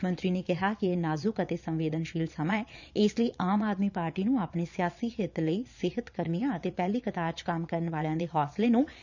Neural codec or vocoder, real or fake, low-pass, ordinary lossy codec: vocoder, 22.05 kHz, 80 mel bands, Vocos; fake; 7.2 kHz; none